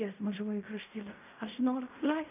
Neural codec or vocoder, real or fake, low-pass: codec, 16 kHz in and 24 kHz out, 0.4 kbps, LongCat-Audio-Codec, fine tuned four codebook decoder; fake; 3.6 kHz